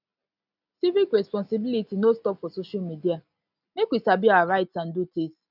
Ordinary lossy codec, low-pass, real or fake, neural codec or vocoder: none; 5.4 kHz; real; none